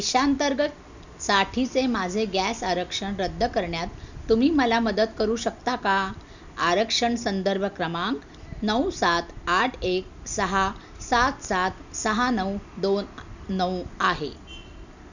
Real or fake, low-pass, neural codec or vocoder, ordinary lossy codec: real; 7.2 kHz; none; none